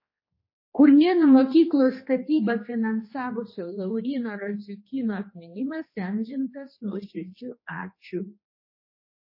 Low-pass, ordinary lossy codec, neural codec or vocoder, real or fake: 5.4 kHz; MP3, 24 kbps; codec, 16 kHz, 2 kbps, X-Codec, HuBERT features, trained on general audio; fake